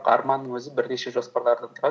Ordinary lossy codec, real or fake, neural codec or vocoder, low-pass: none; real; none; none